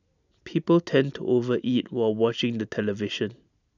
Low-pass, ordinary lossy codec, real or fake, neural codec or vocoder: 7.2 kHz; none; real; none